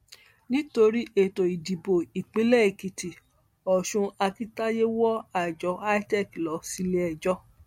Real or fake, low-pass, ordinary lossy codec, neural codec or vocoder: real; 19.8 kHz; MP3, 64 kbps; none